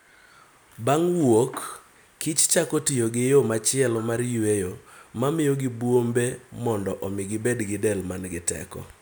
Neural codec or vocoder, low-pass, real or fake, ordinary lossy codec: none; none; real; none